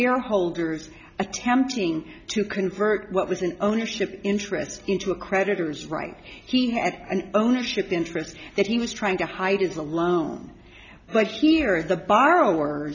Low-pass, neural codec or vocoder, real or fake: 7.2 kHz; none; real